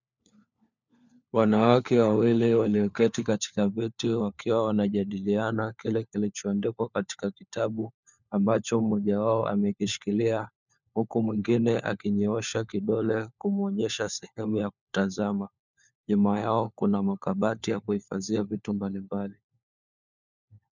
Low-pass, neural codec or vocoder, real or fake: 7.2 kHz; codec, 16 kHz, 4 kbps, FunCodec, trained on LibriTTS, 50 frames a second; fake